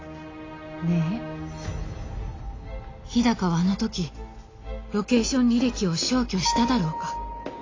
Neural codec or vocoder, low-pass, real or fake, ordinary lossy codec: none; 7.2 kHz; real; AAC, 32 kbps